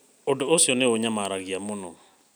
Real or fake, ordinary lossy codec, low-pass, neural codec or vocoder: real; none; none; none